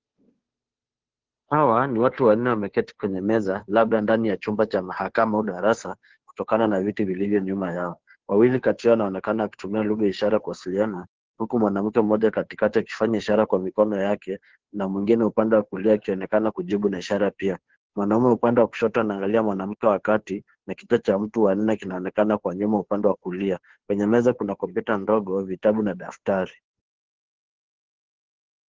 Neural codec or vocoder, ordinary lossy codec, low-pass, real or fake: codec, 16 kHz, 2 kbps, FunCodec, trained on Chinese and English, 25 frames a second; Opus, 16 kbps; 7.2 kHz; fake